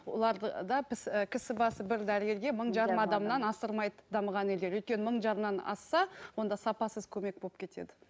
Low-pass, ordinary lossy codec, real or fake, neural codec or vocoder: none; none; real; none